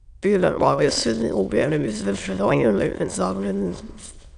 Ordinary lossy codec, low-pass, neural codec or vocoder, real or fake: none; 9.9 kHz; autoencoder, 22.05 kHz, a latent of 192 numbers a frame, VITS, trained on many speakers; fake